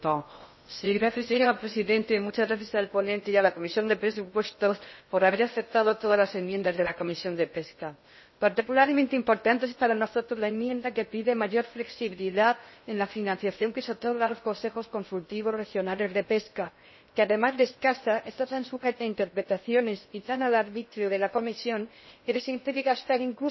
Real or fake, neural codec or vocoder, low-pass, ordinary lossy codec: fake; codec, 16 kHz in and 24 kHz out, 0.6 kbps, FocalCodec, streaming, 2048 codes; 7.2 kHz; MP3, 24 kbps